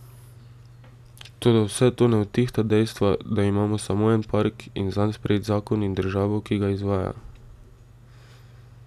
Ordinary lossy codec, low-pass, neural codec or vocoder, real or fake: none; 14.4 kHz; none; real